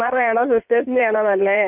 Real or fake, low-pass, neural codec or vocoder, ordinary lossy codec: fake; 3.6 kHz; codec, 16 kHz in and 24 kHz out, 2.2 kbps, FireRedTTS-2 codec; none